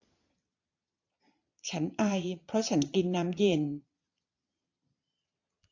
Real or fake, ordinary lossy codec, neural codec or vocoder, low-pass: fake; AAC, 48 kbps; vocoder, 22.05 kHz, 80 mel bands, Vocos; 7.2 kHz